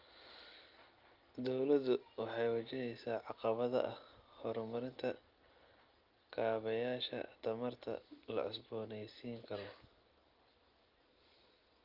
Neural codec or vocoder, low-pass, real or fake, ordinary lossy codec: none; 5.4 kHz; real; Opus, 32 kbps